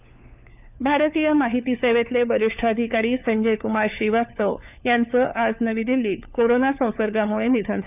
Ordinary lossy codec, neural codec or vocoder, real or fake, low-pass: none; codec, 16 kHz, 4 kbps, FunCodec, trained on LibriTTS, 50 frames a second; fake; 3.6 kHz